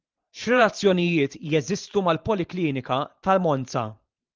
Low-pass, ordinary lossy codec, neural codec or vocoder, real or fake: 7.2 kHz; Opus, 32 kbps; vocoder, 44.1 kHz, 128 mel bands every 512 samples, BigVGAN v2; fake